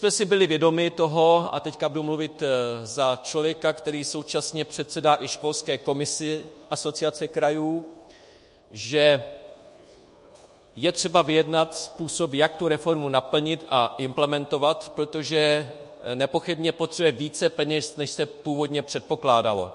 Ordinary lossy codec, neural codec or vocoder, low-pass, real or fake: MP3, 48 kbps; codec, 24 kHz, 1.2 kbps, DualCodec; 10.8 kHz; fake